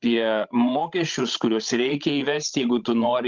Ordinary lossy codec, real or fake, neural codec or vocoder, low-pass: Opus, 32 kbps; fake; codec, 16 kHz, 16 kbps, FreqCodec, larger model; 7.2 kHz